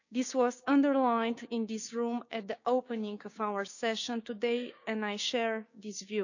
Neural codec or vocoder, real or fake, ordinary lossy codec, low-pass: codec, 16 kHz, 6 kbps, DAC; fake; none; 7.2 kHz